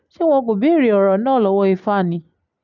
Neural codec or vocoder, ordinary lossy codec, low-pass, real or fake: none; none; 7.2 kHz; real